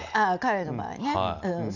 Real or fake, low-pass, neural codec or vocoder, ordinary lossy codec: real; 7.2 kHz; none; none